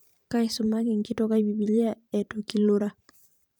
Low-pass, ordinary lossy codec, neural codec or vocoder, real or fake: none; none; vocoder, 44.1 kHz, 128 mel bands every 512 samples, BigVGAN v2; fake